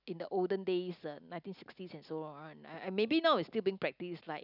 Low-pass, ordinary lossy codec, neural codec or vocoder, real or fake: 5.4 kHz; none; none; real